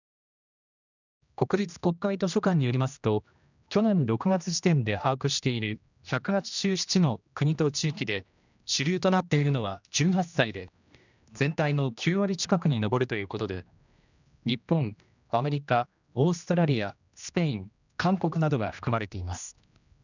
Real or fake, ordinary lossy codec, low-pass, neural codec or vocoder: fake; none; 7.2 kHz; codec, 16 kHz, 1 kbps, X-Codec, HuBERT features, trained on general audio